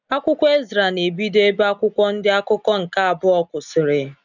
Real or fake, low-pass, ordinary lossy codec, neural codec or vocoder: real; 7.2 kHz; none; none